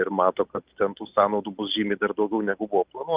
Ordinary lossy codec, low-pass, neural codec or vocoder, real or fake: Opus, 24 kbps; 3.6 kHz; none; real